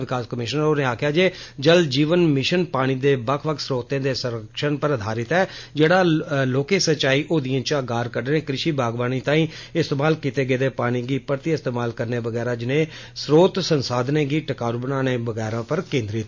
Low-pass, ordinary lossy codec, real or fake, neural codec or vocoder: 7.2 kHz; MP3, 48 kbps; real; none